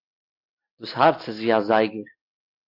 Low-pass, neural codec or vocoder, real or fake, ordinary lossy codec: 5.4 kHz; none; real; AAC, 32 kbps